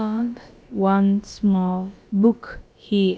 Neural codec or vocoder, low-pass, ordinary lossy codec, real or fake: codec, 16 kHz, about 1 kbps, DyCAST, with the encoder's durations; none; none; fake